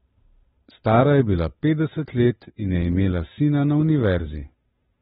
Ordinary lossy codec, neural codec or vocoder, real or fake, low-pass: AAC, 16 kbps; codec, 16 kHz, 8 kbps, FunCodec, trained on Chinese and English, 25 frames a second; fake; 7.2 kHz